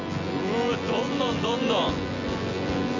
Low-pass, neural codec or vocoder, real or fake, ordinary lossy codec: 7.2 kHz; vocoder, 24 kHz, 100 mel bands, Vocos; fake; none